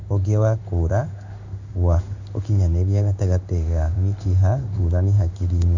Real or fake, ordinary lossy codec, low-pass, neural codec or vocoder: fake; none; 7.2 kHz; codec, 16 kHz in and 24 kHz out, 1 kbps, XY-Tokenizer